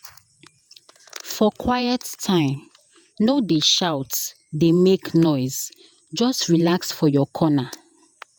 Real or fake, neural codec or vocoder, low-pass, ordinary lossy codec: fake; vocoder, 48 kHz, 128 mel bands, Vocos; none; none